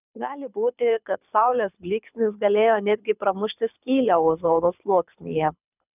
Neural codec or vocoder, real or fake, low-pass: codec, 24 kHz, 6 kbps, HILCodec; fake; 3.6 kHz